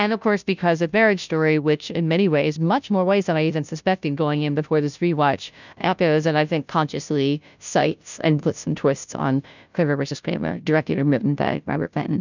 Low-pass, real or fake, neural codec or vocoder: 7.2 kHz; fake; codec, 16 kHz, 0.5 kbps, FunCodec, trained on Chinese and English, 25 frames a second